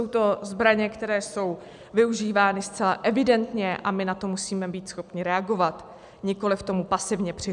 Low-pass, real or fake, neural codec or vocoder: 10.8 kHz; real; none